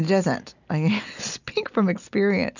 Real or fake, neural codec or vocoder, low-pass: real; none; 7.2 kHz